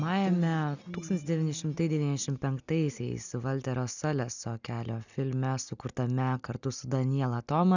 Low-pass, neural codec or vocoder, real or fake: 7.2 kHz; none; real